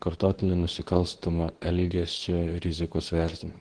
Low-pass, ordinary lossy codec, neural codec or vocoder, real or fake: 9.9 kHz; Opus, 16 kbps; codec, 24 kHz, 0.9 kbps, WavTokenizer, medium speech release version 2; fake